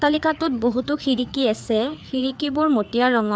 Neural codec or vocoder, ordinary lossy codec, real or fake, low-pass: codec, 16 kHz, 4 kbps, FreqCodec, larger model; none; fake; none